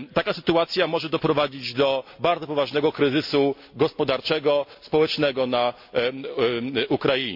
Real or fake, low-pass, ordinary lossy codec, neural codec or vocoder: real; 5.4 kHz; MP3, 48 kbps; none